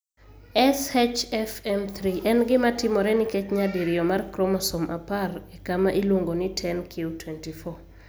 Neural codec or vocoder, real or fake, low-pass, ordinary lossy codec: none; real; none; none